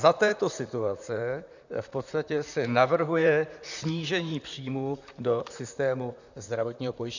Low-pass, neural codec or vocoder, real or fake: 7.2 kHz; vocoder, 44.1 kHz, 128 mel bands, Pupu-Vocoder; fake